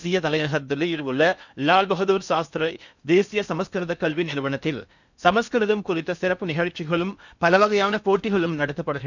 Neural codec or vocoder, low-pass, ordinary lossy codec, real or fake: codec, 16 kHz in and 24 kHz out, 0.8 kbps, FocalCodec, streaming, 65536 codes; 7.2 kHz; none; fake